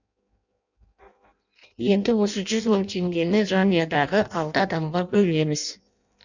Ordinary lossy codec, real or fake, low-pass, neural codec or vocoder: none; fake; 7.2 kHz; codec, 16 kHz in and 24 kHz out, 0.6 kbps, FireRedTTS-2 codec